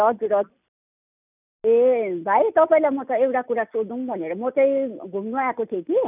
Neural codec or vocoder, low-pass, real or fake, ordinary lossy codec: none; 3.6 kHz; real; none